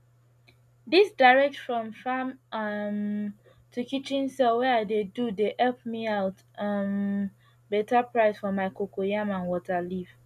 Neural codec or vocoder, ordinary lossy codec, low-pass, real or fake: none; none; 14.4 kHz; real